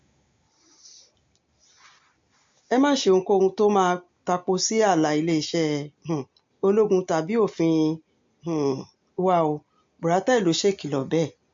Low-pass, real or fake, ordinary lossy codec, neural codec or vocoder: 7.2 kHz; real; MP3, 48 kbps; none